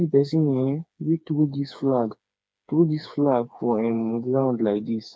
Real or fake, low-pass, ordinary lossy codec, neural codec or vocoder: fake; none; none; codec, 16 kHz, 4 kbps, FreqCodec, smaller model